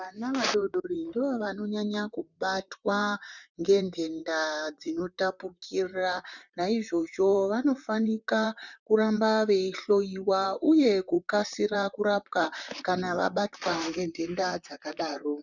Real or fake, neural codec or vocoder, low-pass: fake; vocoder, 44.1 kHz, 128 mel bands, Pupu-Vocoder; 7.2 kHz